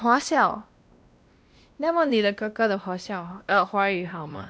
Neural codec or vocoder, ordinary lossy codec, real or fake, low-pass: codec, 16 kHz, 1 kbps, X-Codec, WavLM features, trained on Multilingual LibriSpeech; none; fake; none